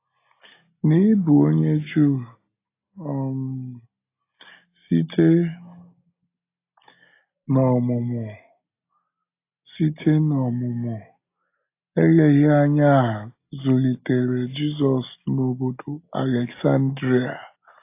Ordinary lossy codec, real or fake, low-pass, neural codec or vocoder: AAC, 24 kbps; real; 3.6 kHz; none